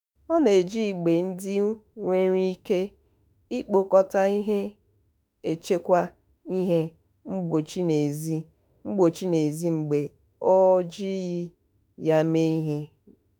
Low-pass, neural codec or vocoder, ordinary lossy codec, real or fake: none; autoencoder, 48 kHz, 32 numbers a frame, DAC-VAE, trained on Japanese speech; none; fake